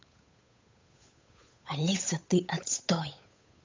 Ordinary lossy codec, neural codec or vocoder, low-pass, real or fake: MP3, 64 kbps; codec, 16 kHz, 8 kbps, FunCodec, trained on Chinese and English, 25 frames a second; 7.2 kHz; fake